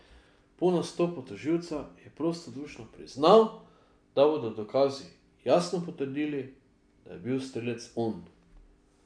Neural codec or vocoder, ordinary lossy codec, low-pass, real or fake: none; none; 9.9 kHz; real